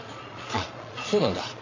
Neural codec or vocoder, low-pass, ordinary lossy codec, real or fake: vocoder, 22.05 kHz, 80 mel bands, Vocos; 7.2 kHz; AAC, 32 kbps; fake